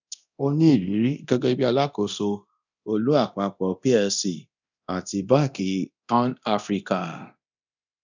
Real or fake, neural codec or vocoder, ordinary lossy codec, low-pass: fake; codec, 24 kHz, 0.9 kbps, DualCodec; none; 7.2 kHz